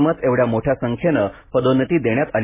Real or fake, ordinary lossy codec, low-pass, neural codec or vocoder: real; MP3, 16 kbps; 3.6 kHz; none